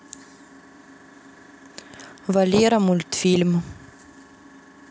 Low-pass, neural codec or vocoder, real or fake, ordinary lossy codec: none; none; real; none